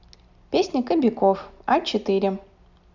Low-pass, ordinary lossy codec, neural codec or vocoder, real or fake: 7.2 kHz; none; none; real